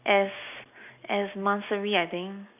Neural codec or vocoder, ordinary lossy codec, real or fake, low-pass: none; none; real; 3.6 kHz